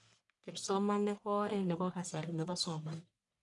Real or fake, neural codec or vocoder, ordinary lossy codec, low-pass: fake; codec, 44.1 kHz, 1.7 kbps, Pupu-Codec; none; 10.8 kHz